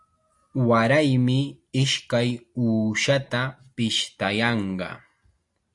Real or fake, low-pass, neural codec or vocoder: real; 10.8 kHz; none